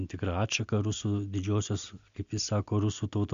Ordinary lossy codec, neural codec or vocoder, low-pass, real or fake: MP3, 64 kbps; none; 7.2 kHz; real